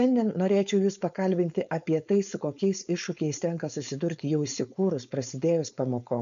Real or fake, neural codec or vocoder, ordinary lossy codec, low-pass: fake; codec, 16 kHz, 4.8 kbps, FACodec; MP3, 96 kbps; 7.2 kHz